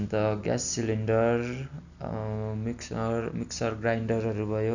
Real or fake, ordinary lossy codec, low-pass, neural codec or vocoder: real; none; 7.2 kHz; none